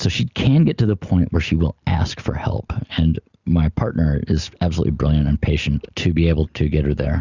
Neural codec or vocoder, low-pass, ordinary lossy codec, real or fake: none; 7.2 kHz; Opus, 64 kbps; real